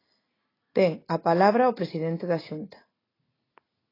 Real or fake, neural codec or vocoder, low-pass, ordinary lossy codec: real; none; 5.4 kHz; AAC, 24 kbps